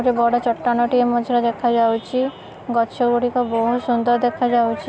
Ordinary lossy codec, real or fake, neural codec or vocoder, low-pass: none; real; none; none